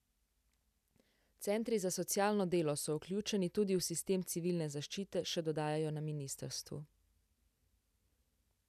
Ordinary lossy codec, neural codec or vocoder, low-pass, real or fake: none; none; 14.4 kHz; real